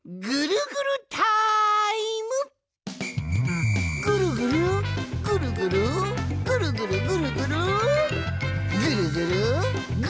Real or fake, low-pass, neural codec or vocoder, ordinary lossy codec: real; none; none; none